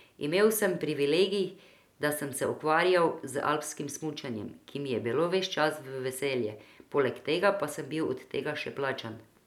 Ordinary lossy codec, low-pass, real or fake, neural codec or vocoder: none; 19.8 kHz; real; none